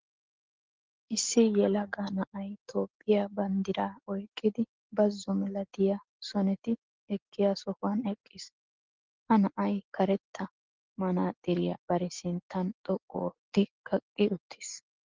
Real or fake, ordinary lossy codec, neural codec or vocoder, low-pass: real; Opus, 16 kbps; none; 7.2 kHz